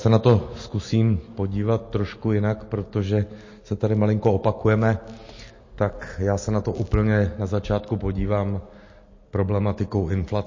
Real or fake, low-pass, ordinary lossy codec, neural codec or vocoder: real; 7.2 kHz; MP3, 32 kbps; none